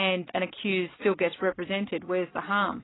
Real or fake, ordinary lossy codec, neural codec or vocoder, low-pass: real; AAC, 16 kbps; none; 7.2 kHz